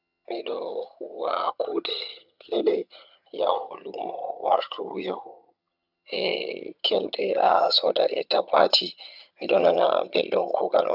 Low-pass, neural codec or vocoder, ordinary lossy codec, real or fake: 5.4 kHz; vocoder, 22.05 kHz, 80 mel bands, HiFi-GAN; none; fake